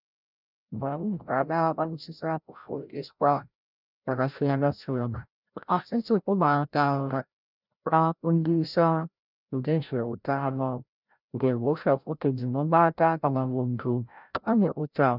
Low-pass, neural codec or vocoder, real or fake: 5.4 kHz; codec, 16 kHz, 0.5 kbps, FreqCodec, larger model; fake